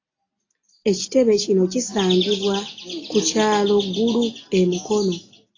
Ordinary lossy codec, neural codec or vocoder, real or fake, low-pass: AAC, 32 kbps; none; real; 7.2 kHz